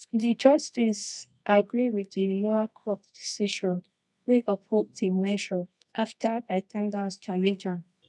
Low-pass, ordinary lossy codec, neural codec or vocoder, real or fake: 10.8 kHz; none; codec, 24 kHz, 0.9 kbps, WavTokenizer, medium music audio release; fake